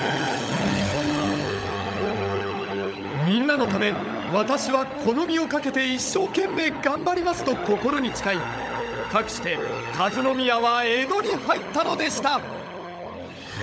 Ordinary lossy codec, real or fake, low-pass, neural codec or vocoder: none; fake; none; codec, 16 kHz, 16 kbps, FunCodec, trained on LibriTTS, 50 frames a second